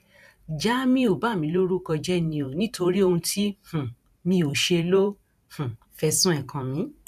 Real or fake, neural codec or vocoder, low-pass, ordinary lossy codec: fake; vocoder, 44.1 kHz, 128 mel bands every 512 samples, BigVGAN v2; 14.4 kHz; none